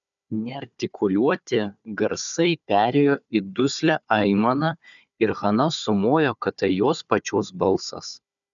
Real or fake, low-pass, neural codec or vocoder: fake; 7.2 kHz; codec, 16 kHz, 4 kbps, FunCodec, trained on Chinese and English, 50 frames a second